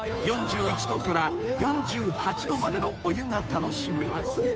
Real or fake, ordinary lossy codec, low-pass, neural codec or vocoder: fake; none; none; codec, 16 kHz, 2 kbps, FunCodec, trained on Chinese and English, 25 frames a second